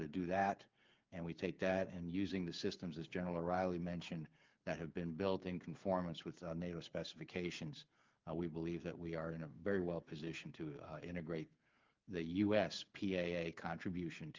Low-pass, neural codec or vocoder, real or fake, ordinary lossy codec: 7.2 kHz; codec, 16 kHz, 8 kbps, FreqCodec, smaller model; fake; Opus, 32 kbps